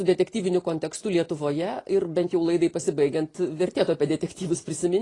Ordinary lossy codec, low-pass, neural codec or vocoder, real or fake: AAC, 32 kbps; 10.8 kHz; none; real